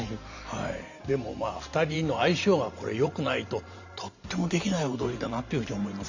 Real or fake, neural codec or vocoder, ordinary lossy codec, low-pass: fake; vocoder, 44.1 kHz, 128 mel bands every 512 samples, BigVGAN v2; none; 7.2 kHz